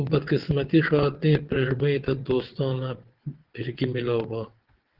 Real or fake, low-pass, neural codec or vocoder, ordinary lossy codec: fake; 5.4 kHz; vocoder, 22.05 kHz, 80 mel bands, WaveNeXt; Opus, 16 kbps